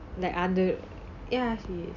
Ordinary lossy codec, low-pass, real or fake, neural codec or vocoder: none; 7.2 kHz; real; none